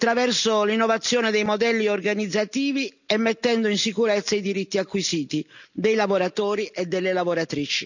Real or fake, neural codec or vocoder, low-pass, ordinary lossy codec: real; none; 7.2 kHz; none